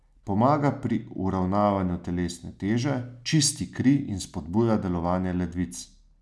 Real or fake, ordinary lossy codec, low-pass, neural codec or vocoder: real; none; none; none